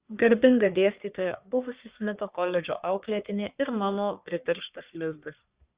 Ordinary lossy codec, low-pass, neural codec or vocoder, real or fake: Opus, 64 kbps; 3.6 kHz; codec, 44.1 kHz, 1.7 kbps, Pupu-Codec; fake